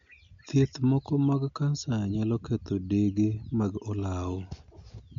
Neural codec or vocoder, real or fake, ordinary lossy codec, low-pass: none; real; MP3, 48 kbps; 7.2 kHz